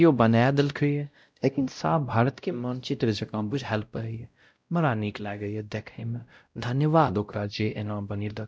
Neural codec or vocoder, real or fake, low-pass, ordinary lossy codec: codec, 16 kHz, 0.5 kbps, X-Codec, WavLM features, trained on Multilingual LibriSpeech; fake; none; none